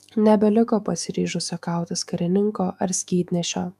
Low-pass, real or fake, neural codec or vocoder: 14.4 kHz; fake; autoencoder, 48 kHz, 128 numbers a frame, DAC-VAE, trained on Japanese speech